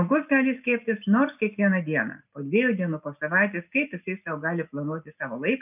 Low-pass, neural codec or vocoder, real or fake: 3.6 kHz; none; real